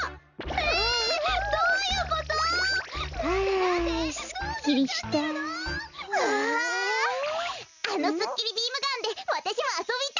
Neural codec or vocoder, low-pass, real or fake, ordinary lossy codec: none; 7.2 kHz; real; none